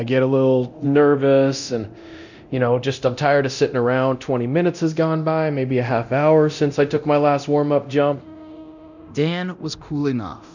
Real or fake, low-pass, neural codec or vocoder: fake; 7.2 kHz; codec, 24 kHz, 0.9 kbps, DualCodec